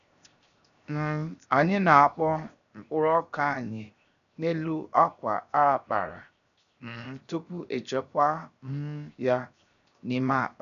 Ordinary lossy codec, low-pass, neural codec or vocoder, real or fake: none; 7.2 kHz; codec, 16 kHz, 0.7 kbps, FocalCodec; fake